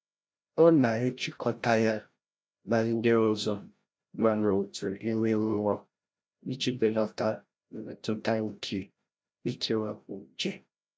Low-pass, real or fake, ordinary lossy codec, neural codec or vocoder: none; fake; none; codec, 16 kHz, 0.5 kbps, FreqCodec, larger model